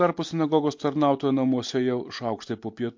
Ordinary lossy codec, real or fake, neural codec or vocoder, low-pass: MP3, 48 kbps; real; none; 7.2 kHz